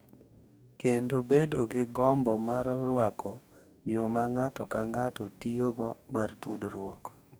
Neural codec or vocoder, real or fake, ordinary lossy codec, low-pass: codec, 44.1 kHz, 2.6 kbps, DAC; fake; none; none